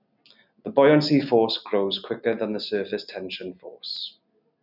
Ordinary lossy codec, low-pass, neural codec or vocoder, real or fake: none; 5.4 kHz; none; real